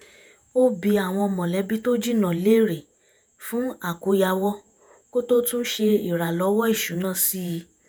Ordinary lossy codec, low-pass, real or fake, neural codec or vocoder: none; none; fake; vocoder, 48 kHz, 128 mel bands, Vocos